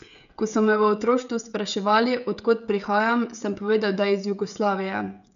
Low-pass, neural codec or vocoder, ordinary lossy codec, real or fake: 7.2 kHz; codec, 16 kHz, 16 kbps, FreqCodec, smaller model; none; fake